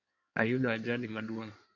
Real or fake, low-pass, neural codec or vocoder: fake; 7.2 kHz; codec, 16 kHz in and 24 kHz out, 2.2 kbps, FireRedTTS-2 codec